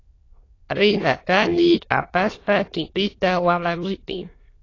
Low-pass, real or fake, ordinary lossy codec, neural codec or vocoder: 7.2 kHz; fake; AAC, 32 kbps; autoencoder, 22.05 kHz, a latent of 192 numbers a frame, VITS, trained on many speakers